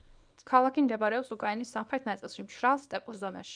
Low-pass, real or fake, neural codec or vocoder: 9.9 kHz; fake; codec, 24 kHz, 0.9 kbps, WavTokenizer, small release